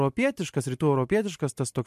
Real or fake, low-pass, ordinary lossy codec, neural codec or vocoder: real; 14.4 kHz; MP3, 64 kbps; none